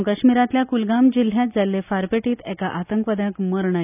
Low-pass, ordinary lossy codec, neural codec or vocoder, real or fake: 3.6 kHz; none; none; real